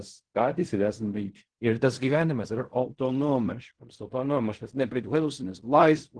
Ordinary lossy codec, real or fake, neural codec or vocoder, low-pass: Opus, 16 kbps; fake; codec, 16 kHz in and 24 kHz out, 0.4 kbps, LongCat-Audio-Codec, fine tuned four codebook decoder; 9.9 kHz